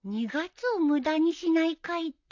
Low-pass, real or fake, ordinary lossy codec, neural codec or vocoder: 7.2 kHz; fake; AAC, 48 kbps; vocoder, 44.1 kHz, 128 mel bands, Pupu-Vocoder